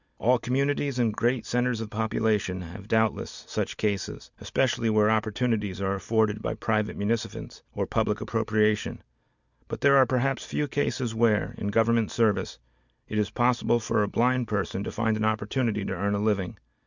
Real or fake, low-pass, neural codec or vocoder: real; 7.2 kHz; none